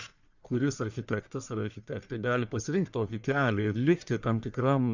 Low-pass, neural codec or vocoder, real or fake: 7.2 kHz; codec, 44.1 kHz, 1.7 kbps, Pupu-Codec; fake